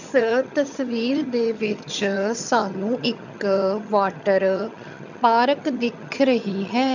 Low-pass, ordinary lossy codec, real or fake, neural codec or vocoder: 7.2 kHz; none; fake; vocoder, 22.05 kHz, 80 mel bands, HiFi-GAN